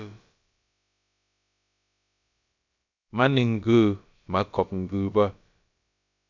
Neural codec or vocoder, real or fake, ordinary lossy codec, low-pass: codec, 16 kHz, about 1 kbps, DyCAST, with the encoder's durations; fake; MP3, 64 kbps; 7.2 kHz